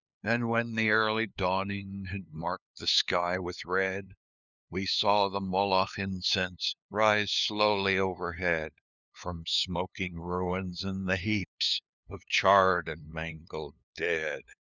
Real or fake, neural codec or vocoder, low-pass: fake; codec, 16 kHz, 2 kbps, FunCodec, trained on LibriTTS, 25 frames a second; 7.2 kHz